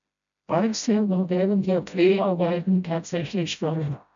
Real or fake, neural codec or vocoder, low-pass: fake; codec, 16 kHz, 0.5 kbps, FreqCodec, smaller model; 7.2 kHz